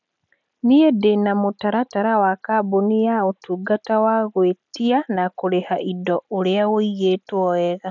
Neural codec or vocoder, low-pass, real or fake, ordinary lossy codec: none; 7.2 kHz; real; none